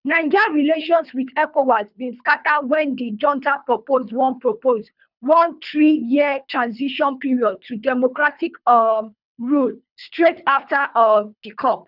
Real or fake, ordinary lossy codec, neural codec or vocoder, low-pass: fake; none; codec, 24 kHz, 3 kbps, HILCodec; 5.4 kHz